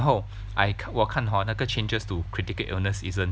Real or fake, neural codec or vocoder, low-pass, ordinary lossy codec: real; none; none; none